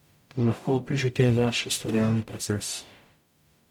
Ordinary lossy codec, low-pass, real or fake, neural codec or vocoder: none; 19.8 kHz; fake; codec, 44.1 kHz, 0.9 kbps, DAC